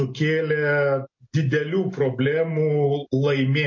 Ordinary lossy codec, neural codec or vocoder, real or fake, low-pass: MP3, 32 kbps; none; real; 7.2 kHz